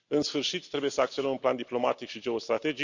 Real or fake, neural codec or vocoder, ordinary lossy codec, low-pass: real; none; none; 7.2 kHz